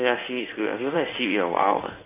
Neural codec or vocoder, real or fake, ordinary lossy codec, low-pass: codec, 16 kHz in and 24 kHz out, 1 kbps, XY-Tokenizer; fake; none; 3.6 kHz